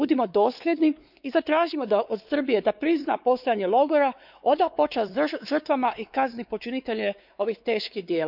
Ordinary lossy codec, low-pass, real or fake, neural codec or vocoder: none; 5.4 kHz; fake; codec, 16 kHz, 4 kbps, X-Codec, WavLM features, trained on Multilingual LibriSpeech